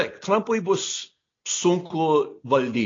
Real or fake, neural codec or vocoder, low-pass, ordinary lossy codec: real; none; 7.2 kHz; AAC, 32 kbps